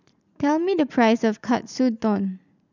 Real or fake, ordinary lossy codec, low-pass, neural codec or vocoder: fake; none; 7.2 kHz; vocoder, 44.1 kHz, 80 mel bands, Vocos